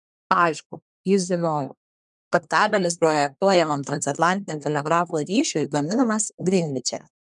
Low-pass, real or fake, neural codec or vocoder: 10.8 kHz; fake; codec, 24 kHz, 1 kbps, SNAC